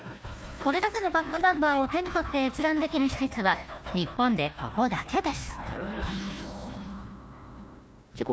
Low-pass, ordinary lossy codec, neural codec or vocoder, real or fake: none; none; codec, 16 kHz, 1 kbps, FunCodec, trained on Chinese and English, 50 frames a second; fake